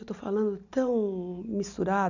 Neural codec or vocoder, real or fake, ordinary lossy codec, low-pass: none; real; none; 7.2 kHz